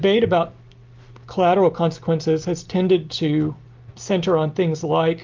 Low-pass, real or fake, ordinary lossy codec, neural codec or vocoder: 7.2 kHz; real; Opus, 32 kbps; none